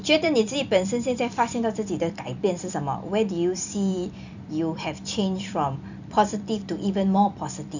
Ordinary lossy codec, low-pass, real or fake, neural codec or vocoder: none; 7.2 kHz; fake; vocoder, 44.1 kHz, 128 mel bands every 256 samples, BigVGAN v2